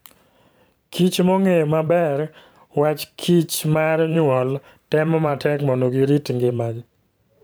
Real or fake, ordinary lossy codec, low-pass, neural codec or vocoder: fake; none; none; vocoder, 44.1 kHz, 128 mel bands every 512 samples, BigVGAN v2